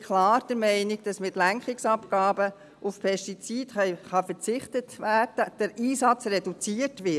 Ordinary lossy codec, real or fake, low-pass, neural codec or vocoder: none; real; none; none